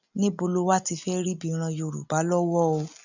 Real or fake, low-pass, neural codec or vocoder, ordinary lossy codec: real; 7.2 kHz; none; none